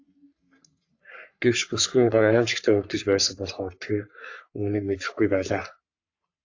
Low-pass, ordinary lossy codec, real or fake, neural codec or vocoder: 7.2 kHz; AAC, 48 kbps; fake; codec, 44.1 kHz, 3.4 kbps, Pupu-Codec